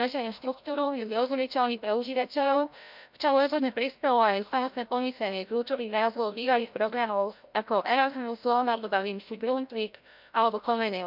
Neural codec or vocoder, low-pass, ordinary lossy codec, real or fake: codec, 16 kHz, 0.5 kbps, FreqCodec, larger model; 5.4 kHz; MP3, 48 kbps; fake